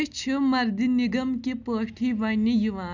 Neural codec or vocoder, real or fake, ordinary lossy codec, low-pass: none; real; none; 7.2 kHz